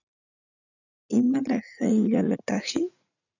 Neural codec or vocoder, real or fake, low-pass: vocoder, 44.1 kHz, 80 mel bands, Vocos; fake; 7.2 kHz